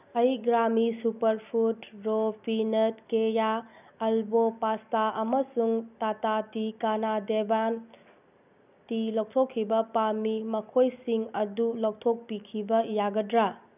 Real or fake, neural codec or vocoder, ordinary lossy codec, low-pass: real; none; none; 3.6 kHz